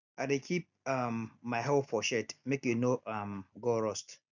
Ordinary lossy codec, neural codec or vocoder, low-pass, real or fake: none; none; 7.2 kHz; real